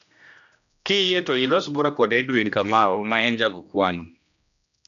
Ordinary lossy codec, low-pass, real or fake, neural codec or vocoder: none; 7.2 kHz; fake; codec, 16 kHz, 1 kbps, X-Codec, HuBERT features, trained on general audio